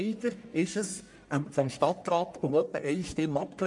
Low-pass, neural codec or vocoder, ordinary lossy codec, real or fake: 10.8 kHz; codec, 44.1 kHz, 1.7 kbps, Pupu-Codec; MP3, 64 kbps; fake